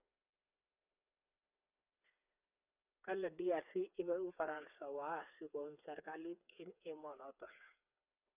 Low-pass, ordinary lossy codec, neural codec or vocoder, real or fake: 3.6 kHz; none; codec, 16 kHz, 4 kbps, FreqCodec, smaller model; fake